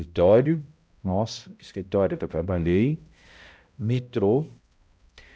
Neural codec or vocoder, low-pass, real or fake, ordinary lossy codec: codec, 16 kHz, 0.5 kbps, X-Codec, HuBERT features, trained on balanced general audio; none; fake; none